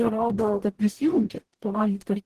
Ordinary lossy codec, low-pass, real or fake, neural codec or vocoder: Opus, 16 kbps; 14.4 kHz; fake; codec, 44.1 kHz, 0.9 kbps, DAC